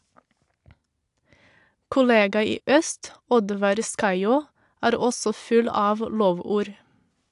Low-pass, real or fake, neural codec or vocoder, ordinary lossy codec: 10.8 kHz; real; none; AAC, 64 kbps